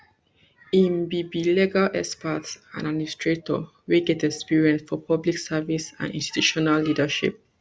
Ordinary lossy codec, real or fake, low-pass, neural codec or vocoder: none; real; none; none